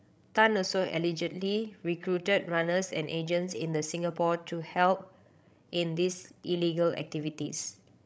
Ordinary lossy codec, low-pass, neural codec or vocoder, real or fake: none; none; codec, 16 kHz, 16 kbps, FreqCodec, larger model; fake